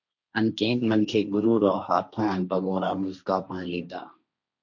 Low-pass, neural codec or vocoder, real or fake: 7.2 kHz; codec, 16 kHz, 1.1 kbps, Voila-Tokenizer; fake